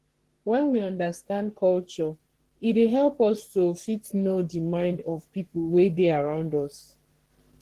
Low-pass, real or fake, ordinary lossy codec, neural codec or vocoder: 14.4 kHz; fake; Opus, 16 kbps; codec, 44.1 kHz, 3.4 kbps, Pupu-Codec